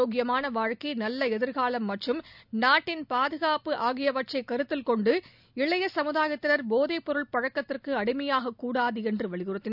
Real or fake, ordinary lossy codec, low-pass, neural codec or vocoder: real; none; 5.4 kHz; none